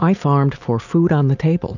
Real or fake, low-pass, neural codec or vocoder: real; 7.2 kHz; none